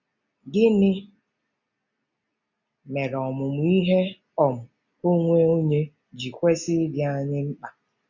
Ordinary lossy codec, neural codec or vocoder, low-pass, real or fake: none; none; 7.2 kHz; real